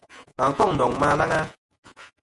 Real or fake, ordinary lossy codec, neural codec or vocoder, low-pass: fake; MP3, 48 kbps; vocoder, 48 kHz, 128 mel bands, Vocos; 10.8 kHz